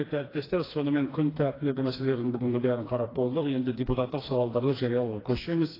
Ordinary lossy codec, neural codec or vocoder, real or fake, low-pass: AAC, 24 kbps; codec, 16 kHz, 2 kbps, FreqCodec, smaller model; fake; 5.4 kHz